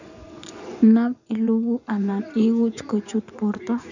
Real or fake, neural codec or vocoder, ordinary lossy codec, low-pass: fake; vocoder, 24 kHz, 100 mel bands, Vocos; none; 7.2 kHz